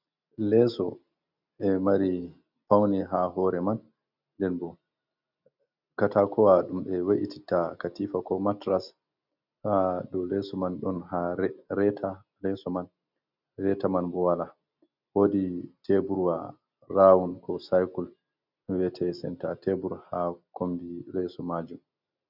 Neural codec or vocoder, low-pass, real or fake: none; 5.4 kHz; real